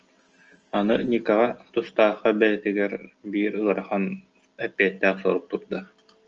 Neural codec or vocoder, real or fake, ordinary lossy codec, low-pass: none; real; Opus, 24 kbps; 7.2 kHz